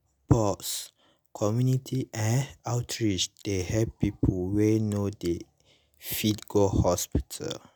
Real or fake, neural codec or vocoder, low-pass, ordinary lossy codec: real; none; none; none